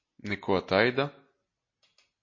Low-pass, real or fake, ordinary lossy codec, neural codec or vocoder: 7.2 kHz; real; MP3, 32 kbps; none